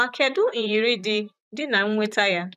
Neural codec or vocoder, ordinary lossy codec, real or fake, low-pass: vocoder, 44.1 kHz, 128 mel bands, Pupu-Vocoder; none; fake; 14.4 kHz